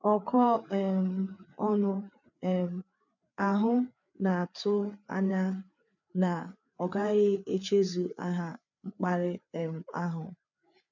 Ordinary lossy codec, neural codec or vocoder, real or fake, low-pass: none; codec, 16 kHz, 4 kbps, FreqCodec, larger model; fake; 7.2 kHz